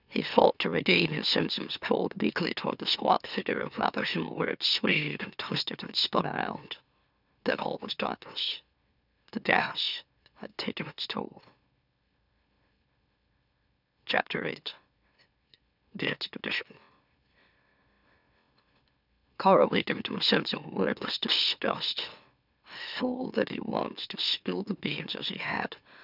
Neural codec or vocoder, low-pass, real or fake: autoencoder, 44.1 kHz, a latent of 192 numbers a frame, MeloTTS; 5.4 kHz; fake